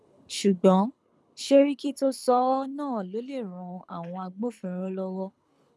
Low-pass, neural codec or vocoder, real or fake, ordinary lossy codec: none; codec, 24 kHz, 6 kbps, HILCodec; fake; none